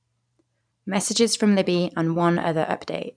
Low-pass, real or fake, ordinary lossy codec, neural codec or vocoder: 9.9 kHz; fake; none; vocoder, 44.1 kHz, 128 mel bands every 512 samples, BigVGAN v2